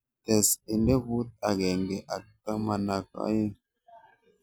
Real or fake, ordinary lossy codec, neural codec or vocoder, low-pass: real; none; none; none